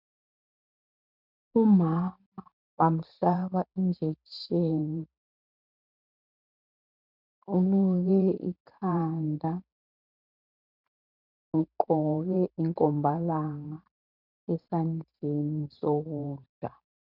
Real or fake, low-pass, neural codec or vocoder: fake; 5.4 kHz; vocoder, 44.1 kHz, 128 mel bands every 512 samples, BigVGAN v2